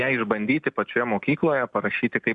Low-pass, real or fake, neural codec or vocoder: 10.8 kHz; real; none